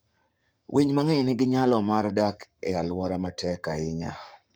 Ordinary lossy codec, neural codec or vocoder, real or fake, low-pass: none; codec, 44.1 kHz, 7.8 kbps, Pupu-Codec; fake; none